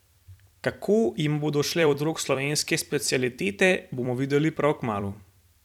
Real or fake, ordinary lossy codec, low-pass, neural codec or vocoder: fake; none; 19.8 kHz; vocoder, 44.1 kHz, 128 mel bands every 256 samples, BigVGAN v2